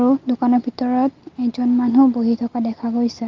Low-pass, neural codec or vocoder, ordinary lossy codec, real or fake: 7.2 kHz; none; Opus, 24 kbps; real